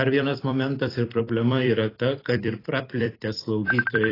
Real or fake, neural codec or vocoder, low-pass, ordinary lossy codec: fake; vocoder, 44.1 kHz, 128 mel bands every 256 samples, BigVGAN v2; 5.4 kHz; AAC, 24 kbps